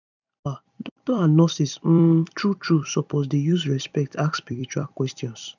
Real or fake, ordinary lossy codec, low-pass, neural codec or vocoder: fake; none; 7.2 kHz; vocoder, 44.1 kHz, 128 mel bands every 256 samples, BigVGAN v2